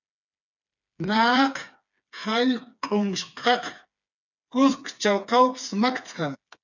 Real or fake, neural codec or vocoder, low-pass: fake; codec, 16 kHz, 4 kbps, FreqCodec, smaller model; 7.2 kHz